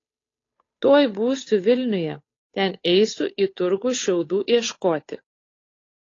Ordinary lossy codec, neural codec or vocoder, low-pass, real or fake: AAC, 32 kbps; codec, 16 kHz, 8 kbps, FunCodec, trained on Chinese and English, 25 frames a second; 7.2 kHz; fake